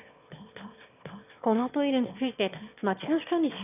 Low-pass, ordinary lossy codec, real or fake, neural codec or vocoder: 3.6 kHz; none; fake; autoencoder, 22.05 kHz, a latent of 192 numbers a frame, VITS, trained on one speaker